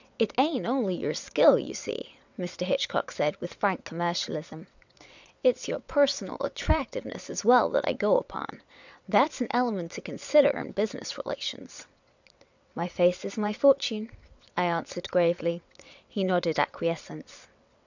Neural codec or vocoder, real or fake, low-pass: vocoder, 22.05 kHz, 80 mel bands, WaveNeXt; fake; 7.2 kHz